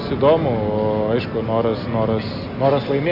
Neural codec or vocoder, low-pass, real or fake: none; 5.4 kHz; real